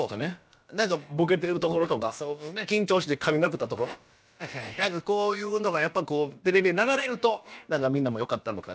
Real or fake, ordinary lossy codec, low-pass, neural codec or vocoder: fake; none; none; codec, 16 kHz, about 1 kbps, DyCAST, with the encoder's durations